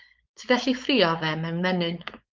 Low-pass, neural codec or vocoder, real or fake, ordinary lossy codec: 7.2 kHz; codec, 16 kHz, 4.8 kbps, FACodec; fake; Opus, 24 kbps